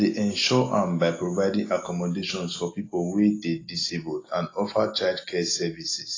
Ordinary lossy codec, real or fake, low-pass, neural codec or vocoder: AAC, 32 kbps; real; 7.2 kHz; none